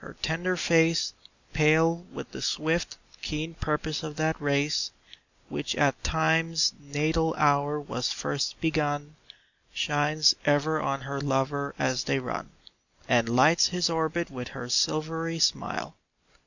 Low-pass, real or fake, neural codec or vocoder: 7.2 kHz; real; none